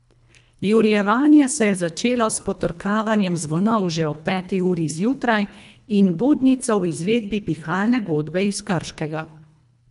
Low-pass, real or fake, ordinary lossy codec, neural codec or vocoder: 10.8 kHz; fake; none; codec, 24 kHz, 1.5 kbps, HILCodec